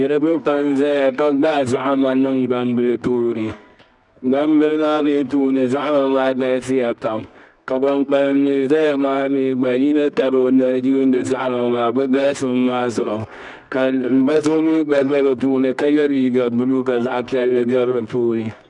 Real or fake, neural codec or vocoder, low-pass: fake; codec, 24 kHz, 0.9 kbps, WavTokenizer, medium music audio release; 10.8 kHz